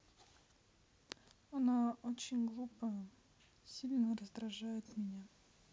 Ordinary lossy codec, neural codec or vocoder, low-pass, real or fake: none; none; none; real